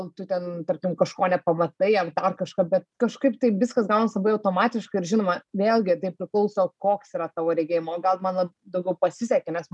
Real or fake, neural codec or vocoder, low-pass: real; none; 10.8 kHz